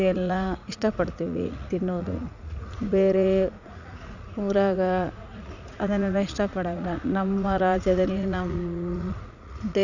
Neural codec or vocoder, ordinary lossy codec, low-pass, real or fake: vocoder, 44.1 kHz, 80 mel bands, Vocos; none; 7.2 kHz; fake